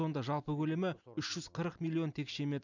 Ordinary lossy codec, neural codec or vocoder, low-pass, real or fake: none; none; 7.2 kHz; real